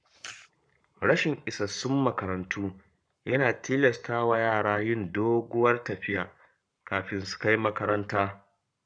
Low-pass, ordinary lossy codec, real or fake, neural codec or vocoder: 9.9 kHz; none; fake; codec, 44.1 kHz, 7.8 kbps, Pupu-Codec